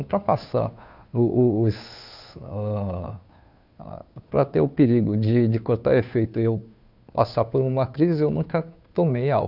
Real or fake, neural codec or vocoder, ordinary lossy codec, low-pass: fake; codec, 16 kHz, 2 kbps, FunCodec, trained on Chinese and English, 25 frames a second; none; 5.4 kHz